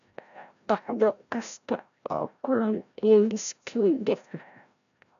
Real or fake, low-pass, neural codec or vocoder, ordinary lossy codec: fake; 7.2 kHz; codec, 16 kHz, 0.5 kbps, FreqCodec, larger model; none